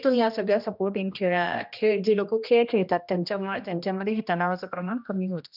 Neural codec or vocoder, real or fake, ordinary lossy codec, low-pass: codec, 16 kHz, 1 kbps, X-Codec, HuBERT features, trained on general audio; fake; none; 5.4 kHz